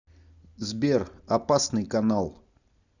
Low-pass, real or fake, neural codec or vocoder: 7.2 kHz; real; none